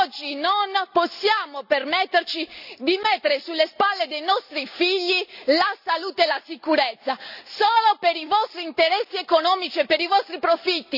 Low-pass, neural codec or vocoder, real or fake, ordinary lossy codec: 5.4 kHz; none; real; none